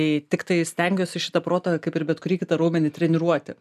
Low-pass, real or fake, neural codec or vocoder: 14.4 kHz; real; none